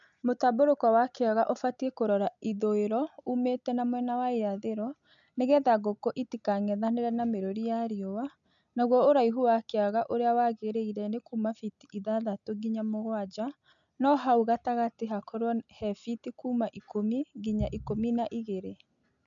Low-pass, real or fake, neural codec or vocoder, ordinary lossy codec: 7.2 kHz; real; none; none